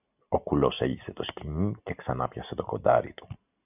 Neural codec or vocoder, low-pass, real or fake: none; 3.6 kHz; real